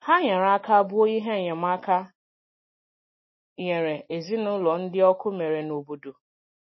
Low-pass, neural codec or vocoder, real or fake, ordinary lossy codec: 7.2 kHz; none; real; MP3, 24 kbps